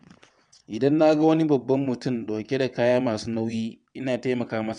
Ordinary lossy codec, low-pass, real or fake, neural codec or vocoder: none; 9.9 kHz; fake; vocoder, 22.05 kHz, 80 mel bands, WaveNeXt